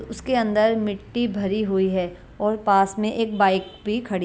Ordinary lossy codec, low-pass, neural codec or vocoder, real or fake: none; none; none; real